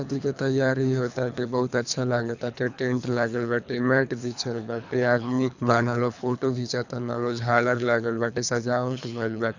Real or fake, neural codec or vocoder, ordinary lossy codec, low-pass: fake; codec, 24 kHz, 3 kbps, HILCodec; none; 7.2 kHz